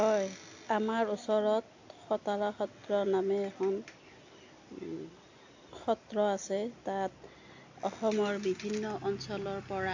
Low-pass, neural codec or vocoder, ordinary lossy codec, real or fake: 7.2 kHz; none; AAC, 48 kbps; real